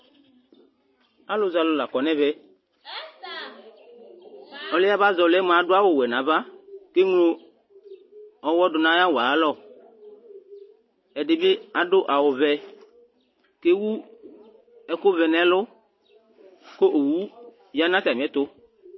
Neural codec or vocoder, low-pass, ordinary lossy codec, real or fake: none; 7.2 kHz; MP3, 24 kbps; real